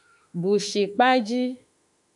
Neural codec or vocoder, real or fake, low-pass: autoencoder, 48 kHz, 32 numbers a frame, DAC-VAE, trained on Japanese speech; fake; 10.8 kHz